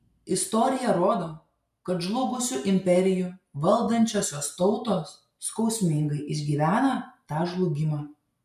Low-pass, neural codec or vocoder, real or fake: 14.4 kHz; none; real